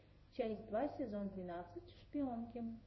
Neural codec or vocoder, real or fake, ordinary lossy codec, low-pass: codec, 16 kHz in and 24 kHz out, 1 kbps, XY-Tokenizer; fake; MP3, 24 kbps; 7.2 kHz